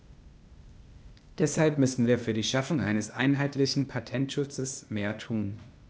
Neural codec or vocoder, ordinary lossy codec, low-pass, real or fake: codec, 16 kHz, 0.8 kbps, ZipCodec; none; none; fake